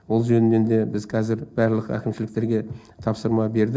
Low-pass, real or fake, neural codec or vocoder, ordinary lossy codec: none; real; none; none